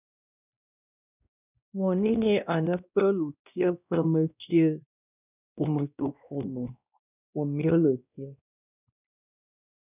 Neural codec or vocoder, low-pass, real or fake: codec, 16 kHz, 1 kbps, X-Codec, WavLM features, trained on Multilingual LibriSpeech; 3.6 kHz; fake